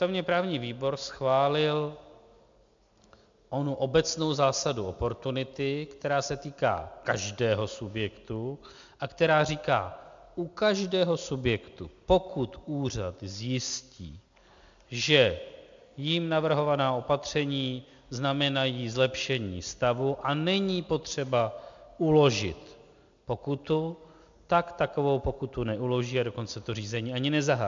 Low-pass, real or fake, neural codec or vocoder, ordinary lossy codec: 7.2 kHz; real; none; MP3, 64 kbps